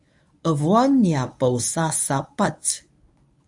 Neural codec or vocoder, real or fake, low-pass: codec, 24 kHz, 0.9 kbps, WavTokenizer, medium speech release version 1; fake; 10.8 kHz